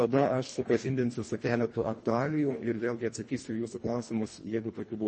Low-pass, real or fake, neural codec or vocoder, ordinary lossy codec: 10.8 kHz; fake; codec, 24 kHz, 1.5 kbps, HILCodec; MP3, 32 kbps